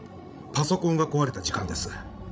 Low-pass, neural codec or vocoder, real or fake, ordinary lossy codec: none; codec, 16 kHz, 16 kbps, FreqCodec, larger model; fake; none